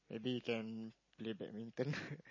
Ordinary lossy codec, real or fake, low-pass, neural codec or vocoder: MP3, 32 kbps; real; 7.2 kHz; none